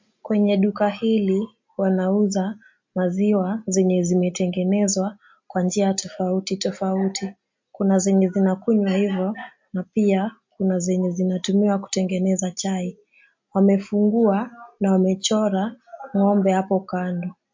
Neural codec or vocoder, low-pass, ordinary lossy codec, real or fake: none; 7.2 kHz; MP3, 48 kbps; real